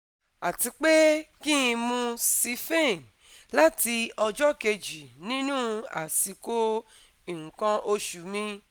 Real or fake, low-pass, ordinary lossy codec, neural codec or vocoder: real; none; none; none